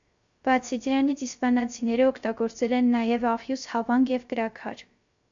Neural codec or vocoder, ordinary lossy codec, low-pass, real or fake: codec, 16 kHz, 0.3 kbps, FocalCodec; MP3, 64 kbps; 7.2 kHz; fake